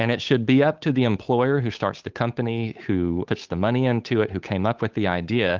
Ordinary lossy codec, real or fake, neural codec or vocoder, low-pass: Opus, 32 kbps; fake; codec, 16 kHz, 8 kbps, FunCodec, trained on Chinese and English, 25 frames a second; 7.2 kHz